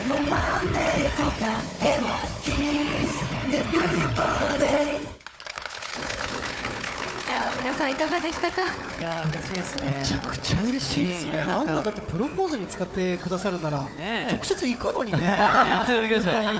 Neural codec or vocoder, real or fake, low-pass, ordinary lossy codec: codec, 16 kHz, 4 kbps, FunCodec, trained on Chinese and English, 50 frames a second; fake; none; none